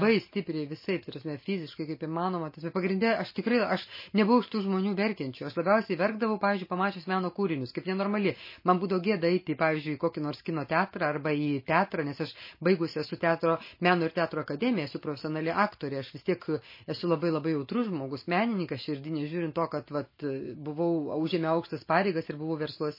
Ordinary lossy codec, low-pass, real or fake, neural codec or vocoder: MP3, 24 kbps; 5.4 kHz; real; none